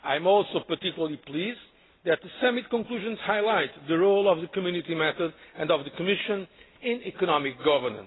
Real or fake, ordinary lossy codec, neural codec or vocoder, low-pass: real; AAC, 16 kbps; none; 7.2 kHz